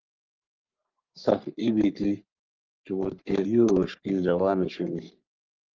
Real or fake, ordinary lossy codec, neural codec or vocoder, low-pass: fake; Opus, 24 kbps; codec, 44.1 kHz, 3.4 kbps, Pupu-Codec; 7.2 kHz